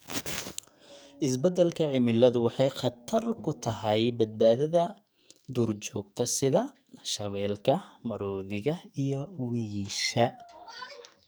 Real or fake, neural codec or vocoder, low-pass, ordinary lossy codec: fake; codec, 44.1 kHz, 2.6 kbps, SNAC; none; none